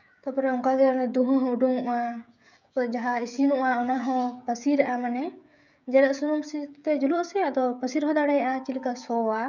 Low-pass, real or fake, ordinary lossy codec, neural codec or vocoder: 7.2 kHz; fake; none; codec, 16 kHz, 16 kbps, FreqCodec, smaller model